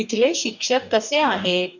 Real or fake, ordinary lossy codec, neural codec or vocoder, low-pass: fake; none; codec, 44.1 kHz, 2.6 kbps, DAC; 7.2 kHz